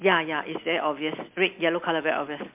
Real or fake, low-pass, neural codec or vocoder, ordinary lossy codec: real; 3.6 kHz; none; MP3, 32 kbps